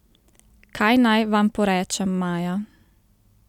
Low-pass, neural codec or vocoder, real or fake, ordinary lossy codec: 19.8 kHz; none; real; none